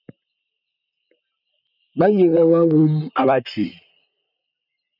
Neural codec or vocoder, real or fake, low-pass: vocoder, 44.1 kHz, 128 mel bands, Pupu-Vocoder; fake; 5.4 kHz